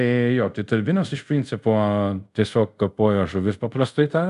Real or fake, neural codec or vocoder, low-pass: fake; codec, 24 kHz, 0.5 kbps, DualCodec; 10.8 kHz